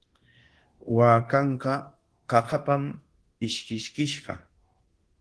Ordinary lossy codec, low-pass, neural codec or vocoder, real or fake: Opus, 16 kbps; 10.8 kHz; codec, 24 kHz, 0.9 kbps, DualCodec; fake